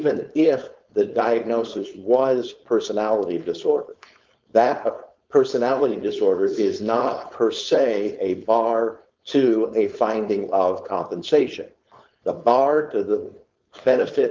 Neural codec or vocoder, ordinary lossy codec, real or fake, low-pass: codec, 16 kHz, 4.8 kbps, FACodec; Opus, 32 kbps; fake; 7.2 kHz